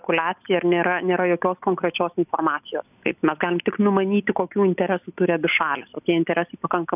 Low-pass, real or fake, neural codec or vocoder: 3.6 kHz; real; none